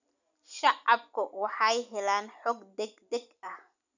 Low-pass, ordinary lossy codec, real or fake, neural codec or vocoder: 7.2 kHz; none; real; none